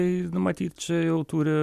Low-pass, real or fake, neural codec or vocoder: 14.4 kHz; real; none